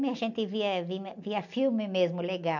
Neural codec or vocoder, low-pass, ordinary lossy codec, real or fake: none; 7.2 kHz; none; real